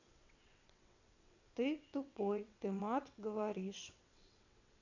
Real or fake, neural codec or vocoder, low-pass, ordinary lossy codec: fake; vocoder, 22.05 kHz, 80 mel bands, WaveNeXt; 7.2 kHz; none